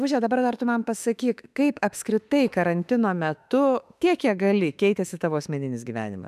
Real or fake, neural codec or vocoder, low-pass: fake; autoencoder, 48 kHz, 32 numbers a frame, DAC-VAE, trained on Japanese speech; 14.4 kHz